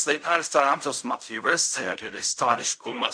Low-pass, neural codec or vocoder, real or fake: 9.9 kHz; codec, 16 kHz in and 24 kHz out, 0.4 kbps, LongCat-Audio-Codec, fine tuned four codebook decoder; fake